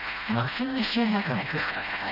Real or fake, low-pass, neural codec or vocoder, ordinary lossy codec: fake; 5.4 kHz; codec, 16 kHz, 0.5 kbps, FreqCodec, smaller model; Opus, 64 kbps